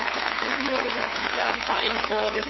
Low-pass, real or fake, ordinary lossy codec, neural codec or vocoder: 7.2 kHz; fake; MP3, 24 kbps; codec, 16 kHz, 2 kbps, FunCodec, trained on LibriTTS, 25 frames a second